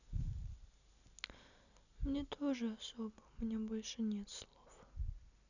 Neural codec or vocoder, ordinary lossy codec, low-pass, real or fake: none; none; 7.2 kHz; real